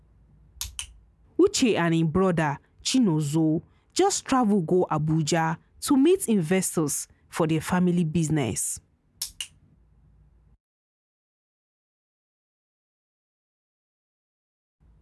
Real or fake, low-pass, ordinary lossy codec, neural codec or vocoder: real; none; none; none